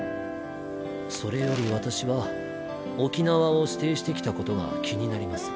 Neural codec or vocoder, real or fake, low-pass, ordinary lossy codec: none; real; none; none